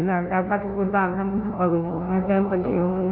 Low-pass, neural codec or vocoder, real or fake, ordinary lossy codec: 5.4 kHz; codec, 16 kHz, 0.5 kbps, FunCodec, trained on LibriTTS, 25 frames a second; fake; MP3, 48 kbps